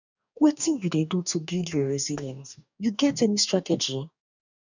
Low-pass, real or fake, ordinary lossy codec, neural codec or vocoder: 7.2 kHz; fake; none; codec, 44.1 kHz, 2.6 kbps, DAC